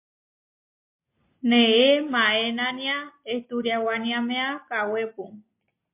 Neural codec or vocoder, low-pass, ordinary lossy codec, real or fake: none; 3.6 kHz; MP3, 24 kbps; real